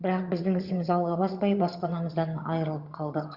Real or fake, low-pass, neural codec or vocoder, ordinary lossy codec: fake; 5.4 kHz; vocoder, 22.05 kHz, 80 mel bands, HiFi-GAN; Opus, 64 kbps